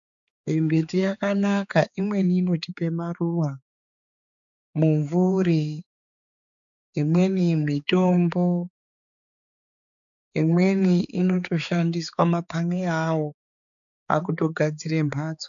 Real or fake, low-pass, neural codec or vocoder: fake; 7.2 kHz; codec, 16 kHz, 4 kbps, X-Codec, HuBERT features, trained on balanced general audio